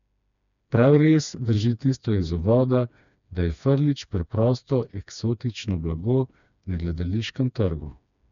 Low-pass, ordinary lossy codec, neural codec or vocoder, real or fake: 7.2 kHz; none; codec, 16 kHz, 2 kbps, FreqCodec, smaller model; fake